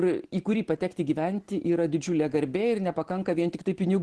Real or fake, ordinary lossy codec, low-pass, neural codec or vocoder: real; Opus, 16 kbps; 10.8 kHz; none